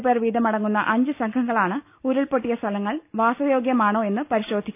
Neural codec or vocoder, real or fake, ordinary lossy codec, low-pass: none; real; none; 3.6 kHz